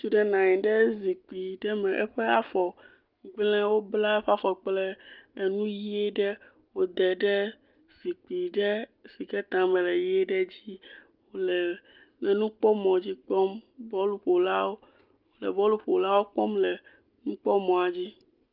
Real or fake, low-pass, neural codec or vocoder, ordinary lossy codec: real; 5.4 kHz; none; Opus, 24 kbps